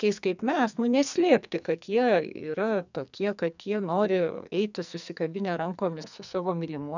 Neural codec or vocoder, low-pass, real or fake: codec, 44.1 kHz, 2.6 kbps, SNAC; 7.2 kHz; fake